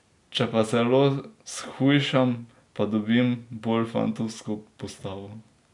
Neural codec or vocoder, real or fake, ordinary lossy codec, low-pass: none; real; MP3, 96 kbps; 10.8 kHz